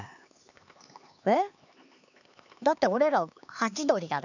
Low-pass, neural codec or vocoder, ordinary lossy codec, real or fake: 7.2 kHz; codec, 16 kHz, 4 kbps, X-Codec, HuBERT features, trained on LibriSpeech; none; fake